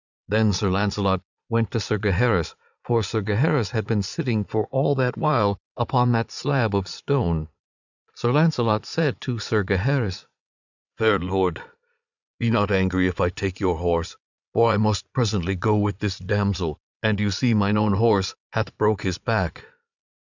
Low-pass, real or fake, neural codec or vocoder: 7.2 kHz; fake; vocoder, 22.05 kHz, 80 mel bands, Vocos